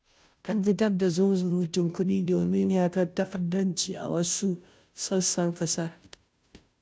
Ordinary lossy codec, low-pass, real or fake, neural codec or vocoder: none; none; fake; codec, 16 kHz, 0.5 kbps, FunCodec, trained on Chinese and English, 25 frames a second